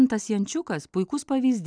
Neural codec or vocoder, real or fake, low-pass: none; real; 9.9 kHz